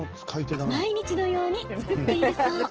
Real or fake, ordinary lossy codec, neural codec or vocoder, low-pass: real; Opus, 16 kbps; none; 7.2 kHz